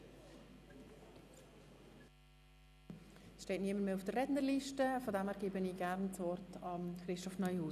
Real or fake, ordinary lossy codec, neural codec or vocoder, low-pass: real; none; none; 14.4 kHz